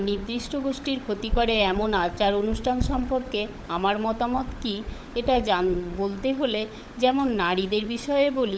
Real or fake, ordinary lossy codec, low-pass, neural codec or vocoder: fake; none; none; codec, 16 kHz, 16 kbps, FunCodec, trained on LibriTTS, 50 frames a second